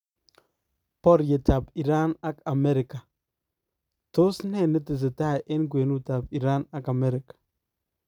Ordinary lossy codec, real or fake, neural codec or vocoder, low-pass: none; real; none; 19.8 kHz